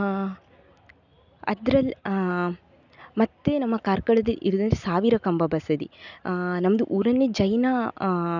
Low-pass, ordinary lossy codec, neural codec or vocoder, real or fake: 7.2 kHz; none; none; real